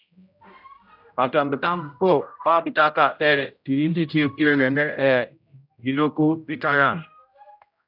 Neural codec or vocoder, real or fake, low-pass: codec, 16 kHz, 0.5 kbps, X-Codec, HuBERT features, trained on general audio; fake; 5.4 kHz